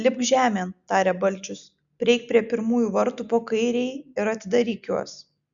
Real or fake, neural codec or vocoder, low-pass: real; none; 7.2 kHz